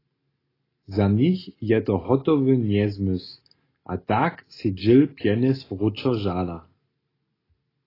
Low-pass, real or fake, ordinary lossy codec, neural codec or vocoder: 5.4 kHz; real; AAC, 24 kbps; none